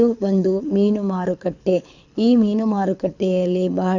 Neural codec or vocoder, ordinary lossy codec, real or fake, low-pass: codec, 24 kHz, 6 kbps, HILCodec; none; fake; 7.2 kHz